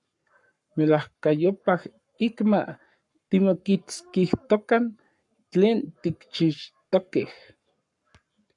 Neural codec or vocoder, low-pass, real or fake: codec, 44.1 kHz, 7.8 kbps, Pupu-Codec; 10.8 kHz; fake